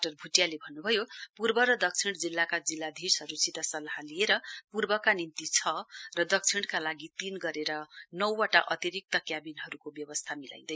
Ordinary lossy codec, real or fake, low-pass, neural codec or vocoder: none; real; none; none